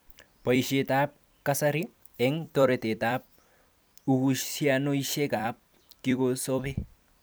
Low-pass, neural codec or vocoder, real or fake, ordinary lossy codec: none; vocoder, 44.1 kHz, 128 mel bands every 256 samples, BigVGAN v2; fake; none